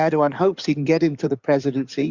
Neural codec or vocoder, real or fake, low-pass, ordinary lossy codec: codec, 16 kHz in and 24 kHz out, 2.2 kbps, FireRedTTS-2 codec; fake; 7.2 kHz; Opus, 64 kbps